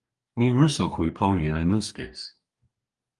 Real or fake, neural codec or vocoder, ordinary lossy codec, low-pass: fake; codec, 44.1 kHz, 2.6 kbps, DAC; Opus, 32 kbps; 10.8 kHz